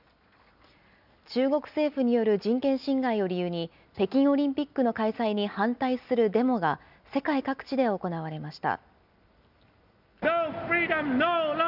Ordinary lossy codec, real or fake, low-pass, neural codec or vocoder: none; real; 5.4 kHz; none